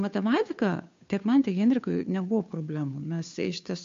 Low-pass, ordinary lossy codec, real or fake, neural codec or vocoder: 7.2 kHz; MP3, 48 kbps; fake; codec, 16 kHz, 2 kbps, FunCodec, trained on Chinese and English, 25 frames a second